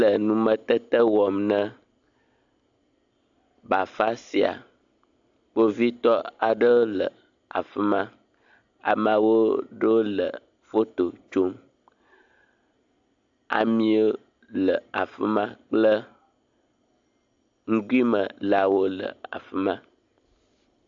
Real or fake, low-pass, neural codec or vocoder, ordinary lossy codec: real; 7.2 kHz; none; AAC, 64 kbps